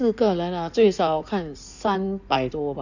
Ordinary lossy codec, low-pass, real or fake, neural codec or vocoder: none; 7.2 kHz; fake; codec, 16 kHz in and 24 kHz out, 2.2 kbps, FireRedTTS-2 codec